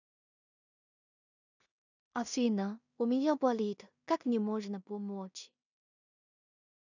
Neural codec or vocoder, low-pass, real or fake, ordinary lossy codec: codec, 16 kHz in and 24 kHz out, 0.4 kbps, LongCat-Audio-Codec, two codebook decoder; 7.2 kHz; fake; none